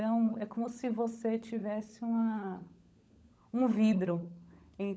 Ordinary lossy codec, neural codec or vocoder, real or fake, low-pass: none; codec, 16 kHz, 16 kbps, FunCodec, trained on LibriTTS, 50 frames a second; fake; none